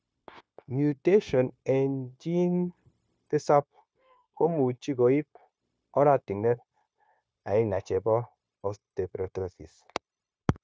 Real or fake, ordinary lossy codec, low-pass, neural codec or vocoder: fake; none; none; codec, 16 kHz, 0.9 kbps, LongCat-Audio-Codec